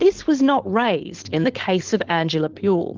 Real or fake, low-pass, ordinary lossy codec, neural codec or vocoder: fake; 7.2 kHz; Opus, 16 kbps; codec, 16 kHz, 4.8 kbps, FACodec